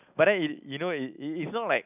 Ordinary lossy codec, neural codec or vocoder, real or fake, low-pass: none; none; real; 3.6 kHz